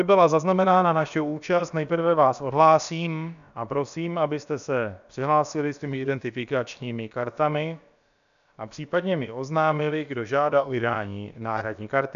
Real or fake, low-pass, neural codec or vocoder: fake; 7.2 kHz; codec, 16 kHz, about 1 kbps, DyCAST, with the encoder's durations